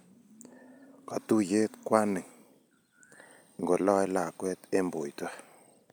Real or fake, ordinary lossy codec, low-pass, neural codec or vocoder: real; none; none; none